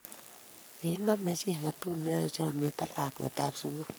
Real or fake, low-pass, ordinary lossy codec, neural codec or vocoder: fake; none; none; codec, 44.1 kHz, 3.4 kbps, Pupu-Codec